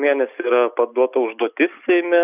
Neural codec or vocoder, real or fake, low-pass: none; real; 3.6 kHz